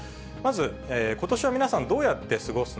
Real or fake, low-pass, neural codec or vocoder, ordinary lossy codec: real; none; none; none